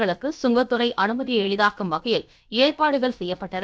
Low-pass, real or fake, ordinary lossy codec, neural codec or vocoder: none; fake; none; codec, 16 kHz, about 1 kbps, DyCAST, with the encoder's durations